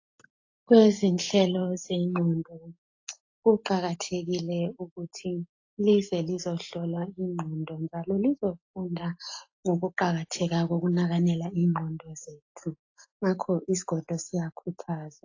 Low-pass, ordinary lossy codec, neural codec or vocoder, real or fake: 7.2 kHz; AAC, 48 kbps; none; real